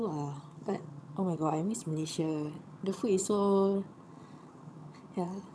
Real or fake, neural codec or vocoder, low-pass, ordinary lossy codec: fake; vocoder, 22.05 kHz, 80 mel bands, HiFi-GAN; none; none